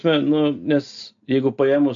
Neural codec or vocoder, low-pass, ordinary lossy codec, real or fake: none; 7.2 kHz; AAC, 64 kbps; real